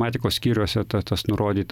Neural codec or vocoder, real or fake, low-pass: none; real; 19.8 kHz